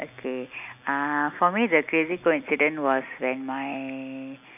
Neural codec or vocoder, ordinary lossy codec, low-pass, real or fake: none; none; 3.6 kHz; real